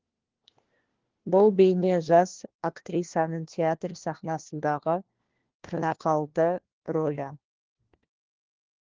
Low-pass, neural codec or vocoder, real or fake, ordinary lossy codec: 7.2 kHz; codec, 16 kHz, 1 kbps, FunCodec, trained on LibriTTS, 50 frames a second; fake; Opus, 16 kbps